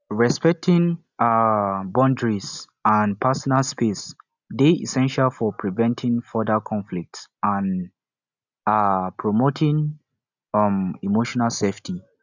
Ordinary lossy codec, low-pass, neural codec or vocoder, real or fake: none; 7.2 kHz; none; real